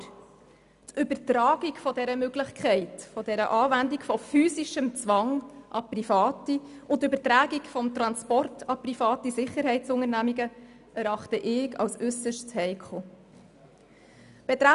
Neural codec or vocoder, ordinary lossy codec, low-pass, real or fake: none; none; 10.8 kHz; real